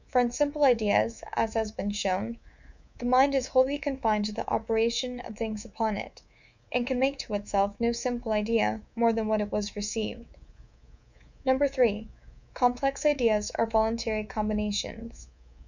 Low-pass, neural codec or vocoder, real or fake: 7.2 kHz; codec, 24 kHz, 3.1 kbps, DualCodec; fake